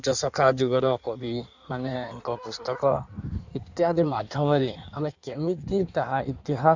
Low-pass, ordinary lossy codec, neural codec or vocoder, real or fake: 7.2 kHz; Opus, 64 kbps; codec, 16 kHz in and 24 kHz out, 1.1 kbps, FireRedTTS-2 codec; fake